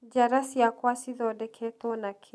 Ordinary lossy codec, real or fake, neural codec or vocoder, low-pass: none; real; none; none